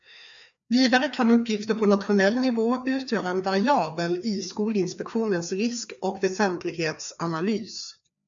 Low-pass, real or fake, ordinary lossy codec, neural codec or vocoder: 7.2 kHz; fake; MP3, 64 kbps; codec, 16 kHz, 2 kbps, FreqCodec, larger model